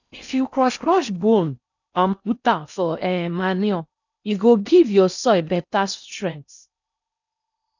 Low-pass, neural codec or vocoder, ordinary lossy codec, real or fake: 7.2 kHz; codec, 16 kHz in and 24 kHz out, 0.6 kbps, FocalCodec, streaming, 4096 codes; none; fake